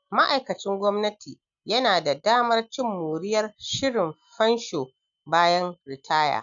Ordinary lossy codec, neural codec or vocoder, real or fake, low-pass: none; none; real; 7.2 kHz